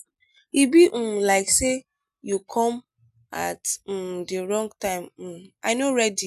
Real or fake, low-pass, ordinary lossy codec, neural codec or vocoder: real; 14.4 kHz; none; none